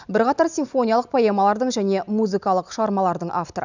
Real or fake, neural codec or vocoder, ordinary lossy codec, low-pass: real; none; none; 7.2 kHz